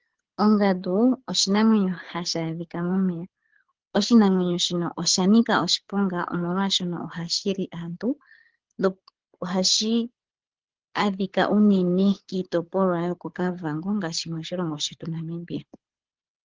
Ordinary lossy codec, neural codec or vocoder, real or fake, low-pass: Opus, 16 kbps; codec, 24 kHz, 6 kbps, HILCodec; fake; 7.2 kHz